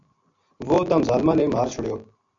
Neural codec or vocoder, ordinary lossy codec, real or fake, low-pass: none; AAC, 64 kbps; real; 7.2 kHz